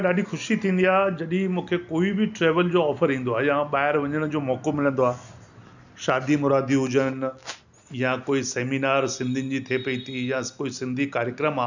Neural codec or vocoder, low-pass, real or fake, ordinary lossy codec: none; 7.2 kHz; real; none